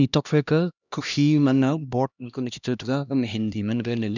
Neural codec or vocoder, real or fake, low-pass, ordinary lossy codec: codec, 16 kHz, 1 kbps, X-Codec, HuBERT features, trained on LibriSpeech; fake; 7.2 kHz; none